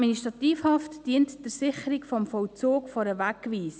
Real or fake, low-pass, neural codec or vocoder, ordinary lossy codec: real; none; none; none